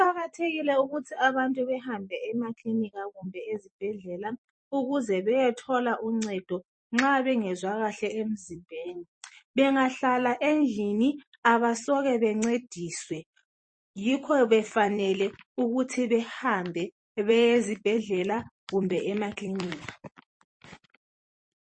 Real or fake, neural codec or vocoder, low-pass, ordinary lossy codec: fake; vocoder, 44.1 kHz, 128 mel bands every 256 samples, BigVGAN v2; 9.9 kHz; MP3, 32 kbps